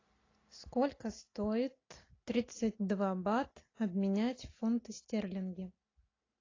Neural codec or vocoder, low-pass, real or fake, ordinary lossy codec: none; 7.2 kHz; real; AAC, 32 kbps